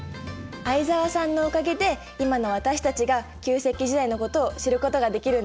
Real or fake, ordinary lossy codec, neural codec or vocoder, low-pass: real; none; none; none